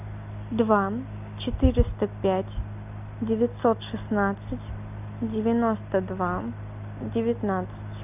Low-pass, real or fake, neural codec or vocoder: 3.6 kHz; real; none